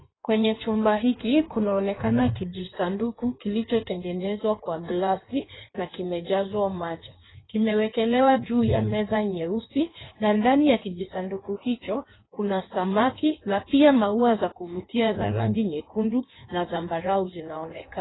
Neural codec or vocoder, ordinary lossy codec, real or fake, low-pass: codec, 16 kHz in and 24 kHz out, 1.1 kbps, FireRedTTS-2 codec; AAC, 16 kbps; fake; 7.2 kHz